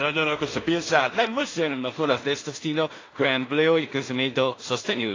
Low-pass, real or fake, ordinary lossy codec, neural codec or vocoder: 7.2 kHz; fake; AAC, 32 kbps; codec, 16 kHz in and 24 kHz out, 0.4 kbps, LongCat-Audio-Codec, two codebook decoder